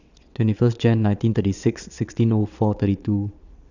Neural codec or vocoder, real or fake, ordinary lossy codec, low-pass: vocoder, 22.05 kHz, 80 mel bands, WaveNeXt; fake; none; 7.2 kHz